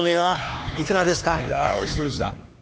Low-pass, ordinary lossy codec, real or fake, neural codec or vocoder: none; none; fake; codec, 16 kHz, 2 kbps, X-Codec, HuBERT features, trained on LibriSpeech